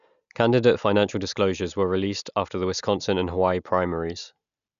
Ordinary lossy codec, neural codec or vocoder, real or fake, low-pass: none; none; real; 7.2 kHz